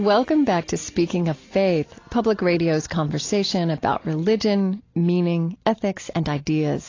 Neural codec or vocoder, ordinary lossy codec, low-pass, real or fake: none; AAC, 32 kbps; 7.2 kHz; real